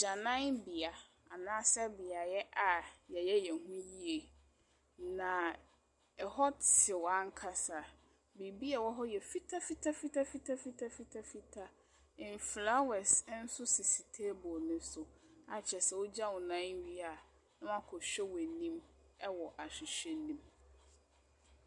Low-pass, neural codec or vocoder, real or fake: 10.8 kHz; none; real